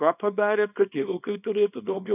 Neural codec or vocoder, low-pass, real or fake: codec, 24 kHz, 0.9 kbps, WavTokenizer, small release; 3.6 kHz; fake